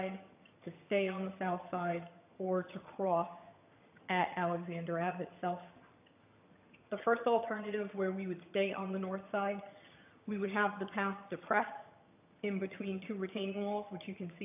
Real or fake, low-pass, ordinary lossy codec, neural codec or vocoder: fake; 3.6 kHz; AAC, 32 kbps; vocoder, 22.05 kHz, 80 mel bands, HiFi-GAN